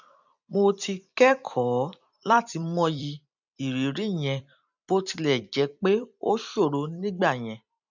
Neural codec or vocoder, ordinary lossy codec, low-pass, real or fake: vocoder, 44.1 kHz, 128 mel bands every 256 samples, BigVGAN v2; none; 7.2 kHz; fake